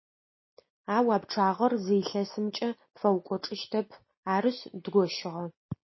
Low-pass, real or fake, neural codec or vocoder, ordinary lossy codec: 7.2 kHz; real; none; MP3, 24 kbps